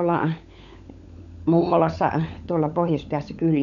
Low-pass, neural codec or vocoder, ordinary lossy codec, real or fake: 7.2 kHz; codec, 16 kHz, 16 kbps, FunCodec, trained on LibriTTS, 50 frames a second; none; fake